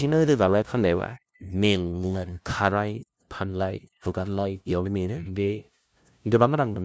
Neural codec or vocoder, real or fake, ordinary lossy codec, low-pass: codec, 16 kHz, 0.5 kbps, FunCodec, trained on LibriTTS, 25 frames a second; fake; none; none